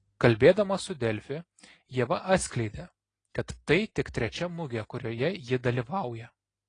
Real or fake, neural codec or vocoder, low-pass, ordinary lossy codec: real; none; 9.9 kHz; AAC, 32 kbps